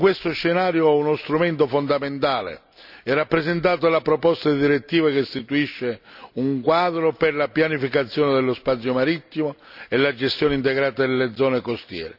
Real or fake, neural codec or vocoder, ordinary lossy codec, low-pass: real; none; none; 5.4 kHz